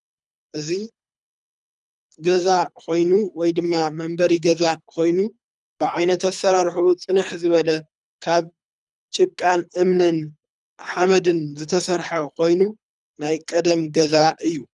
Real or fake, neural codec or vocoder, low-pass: fake; codec, 24 kHz, 3 kbps, HILCodec; 10.8 kHz